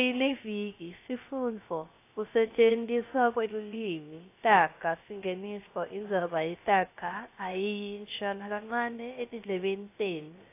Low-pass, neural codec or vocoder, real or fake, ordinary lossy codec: 3.6 kHz; codec, 16 kHz, 0.3 kbps, FocalCodec; fake; AAC, 24 kbps